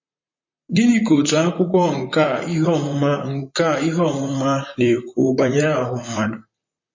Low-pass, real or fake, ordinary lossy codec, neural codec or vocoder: 7.2 kHz; fake; MP3, 32 kbps; vocoder, 44.1 kHz, 128 mel bands, Pupu-Vocoder